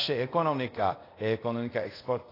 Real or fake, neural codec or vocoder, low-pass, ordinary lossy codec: fake; codec, 24 kHz, 0.5 kbps, DualCodec; 5.4 kHz; AAC, 24 kbps